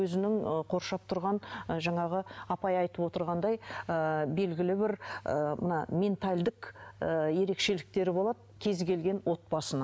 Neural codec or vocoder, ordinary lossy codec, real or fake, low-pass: none; none; real; none